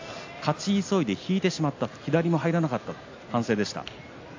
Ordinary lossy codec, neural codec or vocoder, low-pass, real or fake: AAC, 48 kbps; none; 7.2 kHz; real